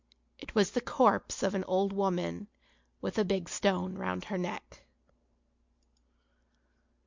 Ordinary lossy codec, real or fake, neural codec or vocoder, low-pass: MP3, 64 kbps; real; none; 7.2 kHz